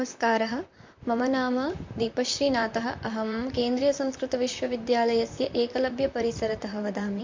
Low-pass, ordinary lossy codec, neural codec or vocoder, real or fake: 7.2 kHz; MP3, 48 kbps; vocoder, 44.1 kHz, 128 mel bands, Pupu-Vocoder; fake